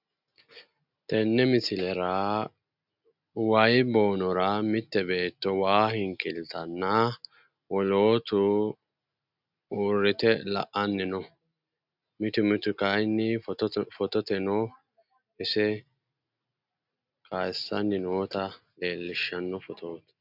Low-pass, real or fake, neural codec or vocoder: 5.4 kHz; real; none